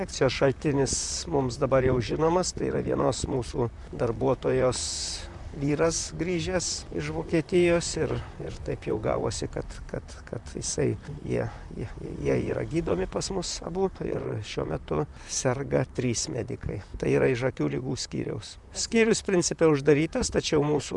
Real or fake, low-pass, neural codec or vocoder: fake; 10.8 kHz; vocoder, 44.1 kHz, 128 mel bands, Pupu-Vocoder